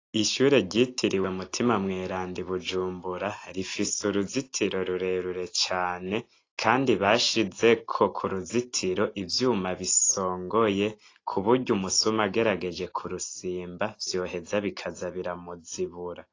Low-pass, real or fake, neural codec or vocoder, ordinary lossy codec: 7.2 kHz; real; none; AAC, 32 kbps